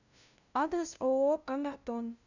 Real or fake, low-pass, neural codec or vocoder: fake; 7.2 kHz; codec, 16 kHz, 0.5 kbps, FunCodec, trained on LibriTTS, 25 frames a second